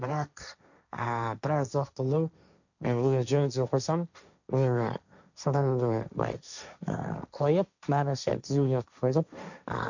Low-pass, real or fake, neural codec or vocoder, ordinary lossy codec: 7.2 kHz; fake; codec, 16 kHz, 1.1 kbps, Voila-Tokenizer; none